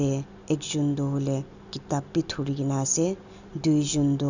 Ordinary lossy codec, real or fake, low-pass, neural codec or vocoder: none; real; 7.2 kHz; none